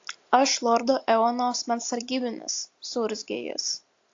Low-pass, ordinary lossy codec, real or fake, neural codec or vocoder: 7.2 kHz; AAC, 48 kbps; real; none